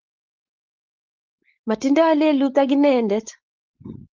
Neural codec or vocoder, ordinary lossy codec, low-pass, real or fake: codec, 16 kHz, 4.8 kbps, FACodec; Opus, 32 kbps; 7.2 kHz; fake